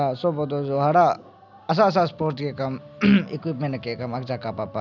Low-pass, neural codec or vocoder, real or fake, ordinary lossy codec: 7.2 kHz; none; real; none